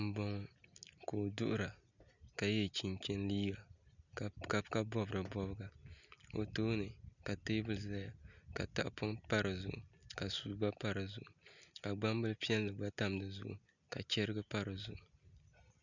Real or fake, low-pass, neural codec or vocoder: real; 7.2 kHz; none